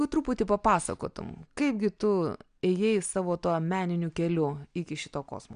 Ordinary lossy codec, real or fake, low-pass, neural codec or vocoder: MP3, 96 kbps; real; 9.9 kHz; none